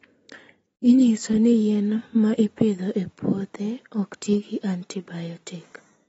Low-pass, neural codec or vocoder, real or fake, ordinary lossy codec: 19.8 kHz; none; real; AAC, 24 kbps